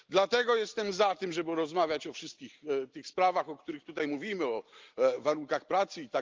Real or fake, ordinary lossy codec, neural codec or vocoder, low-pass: real; Opus, 24 kbps; none; 7.2 kHz